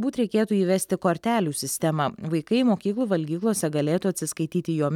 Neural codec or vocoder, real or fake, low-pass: none; real; 19.8 kHz